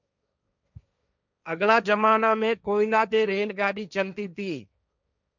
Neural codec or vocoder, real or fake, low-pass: codec, 16 kHz, 1.1 kbps, Voila-Tokenizer; fake; 7.2 kHz